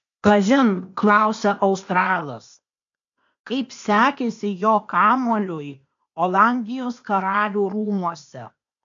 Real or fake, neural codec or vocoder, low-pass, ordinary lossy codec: fake; codec, 16 kHz, 0.8 kbps, ZipCodec; 7.2 kHz; AAC, 64 kbps